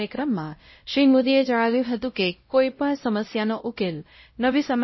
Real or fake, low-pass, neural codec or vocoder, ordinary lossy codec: fake; 7.2 kHz; codec, 16 kHz, 0.5 kbps, X-Codec, WavLM features, trained on Multilingual LibriSpeech; MP3, 24 kbps